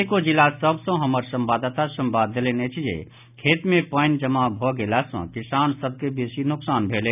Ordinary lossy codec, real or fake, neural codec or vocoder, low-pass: none; real; none; 3.6 kHz